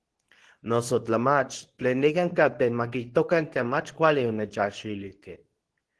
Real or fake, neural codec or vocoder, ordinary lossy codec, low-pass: fake; codec, 24 kHz, 0.9 kbps, WavTokenizer, medium speech release version 2; Opus, 16 kbps; 10.8 kHz